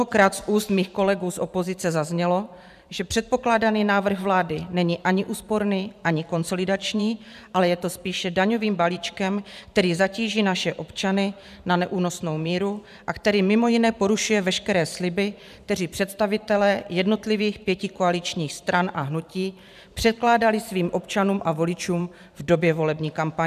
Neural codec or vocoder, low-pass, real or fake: vocoder, 44.1 kHz, 128 mel bands every 512 samples, BigVGAN v2; 14.4 kHz; fake